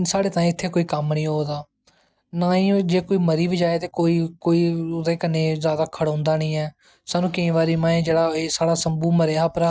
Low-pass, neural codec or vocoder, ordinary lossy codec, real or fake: none; none; none; real